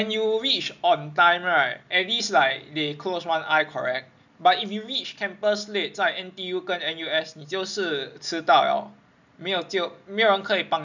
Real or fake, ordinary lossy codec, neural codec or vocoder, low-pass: real; none; none; 7.2 kHz